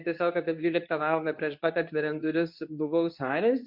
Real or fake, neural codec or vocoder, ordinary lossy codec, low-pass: fake; codec, 24 kHz, 0.9 kbps, WavTokenizer, medium speech release version 2; MP3, 48 kbps; 5.4 kHz